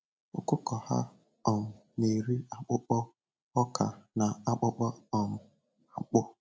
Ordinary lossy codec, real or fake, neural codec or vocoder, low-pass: none; real; none; none